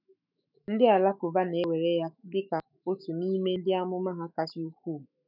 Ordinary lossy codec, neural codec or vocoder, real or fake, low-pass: none; autoencoder, 48 kHz, 128 numbers a frame, DAC-VAE, trained on Japanese speech; fake; 5.4 kHz